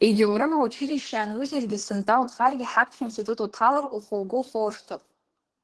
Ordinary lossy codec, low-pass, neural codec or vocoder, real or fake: Opus, 16 kbps; 10.8 kHz; codec, 24 kHz, 1 kbps, SNAC; fake